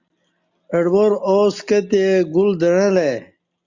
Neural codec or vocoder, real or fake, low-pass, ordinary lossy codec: none; real; 7.2 kHz; Opus, 64 kbps